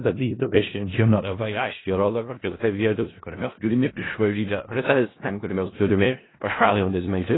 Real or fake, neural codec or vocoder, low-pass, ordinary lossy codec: fake; codec, 16 kHz in and 24 kHz out, 0.4 kbps, LongCat-Audio-Codec, four codebook decoder; 7.2 kHz; AAC, 16 kbps